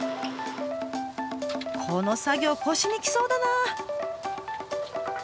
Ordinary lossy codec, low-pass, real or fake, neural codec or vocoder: none; none; real; none